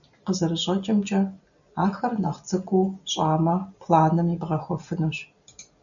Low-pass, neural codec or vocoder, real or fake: 7.2 kHz; none; real